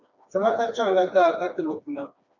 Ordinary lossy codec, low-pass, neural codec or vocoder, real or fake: MP3, 64 kbps; 7.2 kHz; codec, 16 kHz, 2 kbps, FreqCodec, smaller model; fake